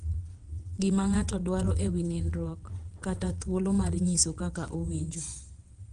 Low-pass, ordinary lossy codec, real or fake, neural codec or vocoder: 9.9 kHz; Opus, 24 kbps; fake; vocoder, 22.05 kHz, 80 mel bands, WaveNeXt